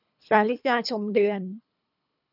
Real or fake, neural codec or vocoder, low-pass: fake; codec, 24 kHz, 3 kbps, HILCodec; 5.4 kHz